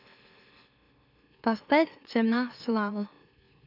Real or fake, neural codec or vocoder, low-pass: fake; autoencoder, 44.1 kHz, a latent of 192 numbers a frame, MeloTTS; 5.4 kHz